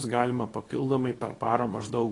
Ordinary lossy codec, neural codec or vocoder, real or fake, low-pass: AAC, 32 kbps; codec, 24 kHz, 0.9 kbps, WavTokenizer, small release; fake; 10.8 kHz